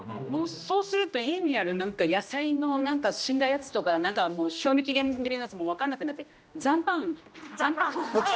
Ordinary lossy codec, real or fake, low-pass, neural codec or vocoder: none; fake; none; codec, 16 kHz, 1 kbps, X-Codec, HuBERT features, trained on general audio